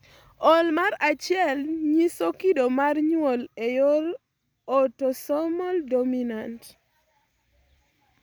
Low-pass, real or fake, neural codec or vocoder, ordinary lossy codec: none; real; none; none